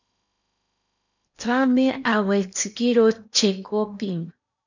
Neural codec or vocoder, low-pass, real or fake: codec, 16 kHz in and 24 kHz out, 0.8 kbps, FocalCodec, streaming, 65536 codes; 7.2 kHz; fake